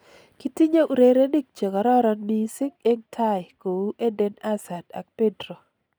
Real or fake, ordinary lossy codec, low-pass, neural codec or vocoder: real; none; none; none